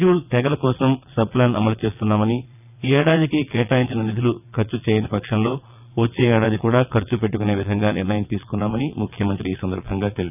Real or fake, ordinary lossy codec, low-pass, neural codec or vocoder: fake; none; 3.6 kHz; vocoder, 22.05 kHz, 80 mel bands, WaveNeXt